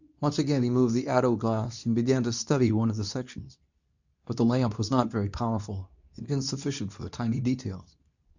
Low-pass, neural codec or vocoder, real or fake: 7.2 kHz; codec, 24 kHz, 0.9 kbps, WavTokenizer, medium speech release version 2; fake